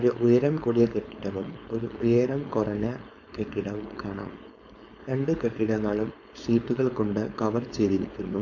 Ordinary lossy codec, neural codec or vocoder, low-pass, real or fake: MP3, 48 kbps; codec, 16 kHz, 4.8 kbps, FACodec; 7.2 kHz; fake